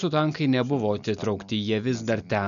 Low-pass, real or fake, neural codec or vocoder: 7.2 kHz; real; none